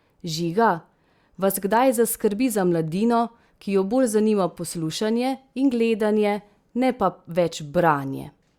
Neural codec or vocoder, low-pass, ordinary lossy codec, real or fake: none; 19.8 kHz; Opus, 64 kbps; real